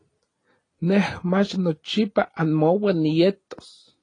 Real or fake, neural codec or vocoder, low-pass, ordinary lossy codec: real; none; 9.9 kHz; AAC, 32 kbps